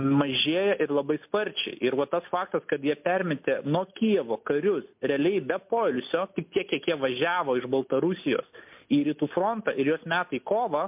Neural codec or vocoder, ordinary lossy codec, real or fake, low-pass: none; MP3, 32 kbps; real; 3.6 kHz